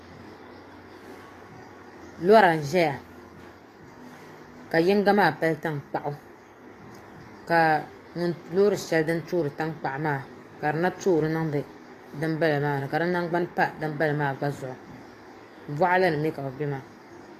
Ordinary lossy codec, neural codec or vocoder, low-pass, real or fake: AAC, 48 kbps; codec, 44.1 kHz, 7.8 kbps, DAC; 14.4 kHz; fake